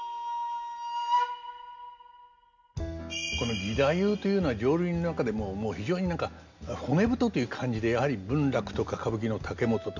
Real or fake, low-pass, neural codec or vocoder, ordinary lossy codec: real; 7.2 kHz; none; none